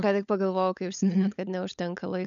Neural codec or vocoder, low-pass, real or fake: codec, 16 kHz, 16 kbps, FunCodec, trained on LibriTTS, 50 frames a second; 7.2 kHz; fake